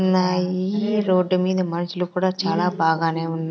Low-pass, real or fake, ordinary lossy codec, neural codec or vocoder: none; real; none; none